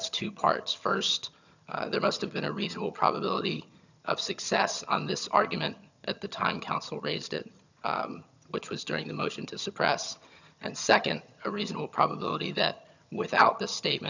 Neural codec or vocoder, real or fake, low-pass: vocoder, 22.05 kHz, 80 mel bands, HiFi-GAN; fake; 7.2 kHz